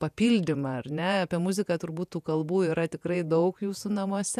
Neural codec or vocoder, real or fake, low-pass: vocoder, 48 kHz, 128 mel bands, Vocos; fake; 14.4 kHz